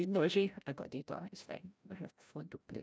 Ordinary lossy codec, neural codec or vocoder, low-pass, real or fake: none; codec, 16 kHz, 0.5 kbps, FreqCodec, larger model; none; fake